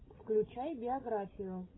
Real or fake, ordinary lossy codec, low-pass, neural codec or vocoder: fake; AAC, 16 kbps; 7.2 kHz; codec, 16 kHz, 16 kbps, FreqCodec, larger model